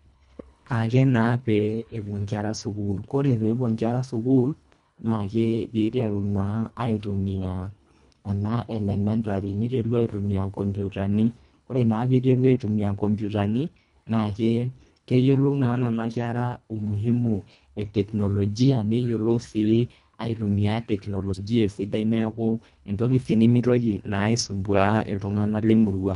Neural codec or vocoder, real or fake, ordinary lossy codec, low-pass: codec, 24 kHz, 1.5 kbps, HILCodec; fake; none; 10.8 kHz